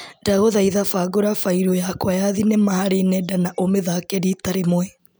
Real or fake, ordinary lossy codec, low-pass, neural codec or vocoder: real; none; none; none